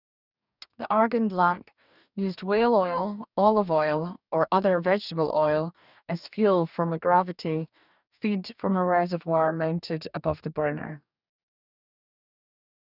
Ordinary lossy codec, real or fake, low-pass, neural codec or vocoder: none; fake; 5.4 kHz; codec, 44.1 kHz, 2.6 kbps, DAC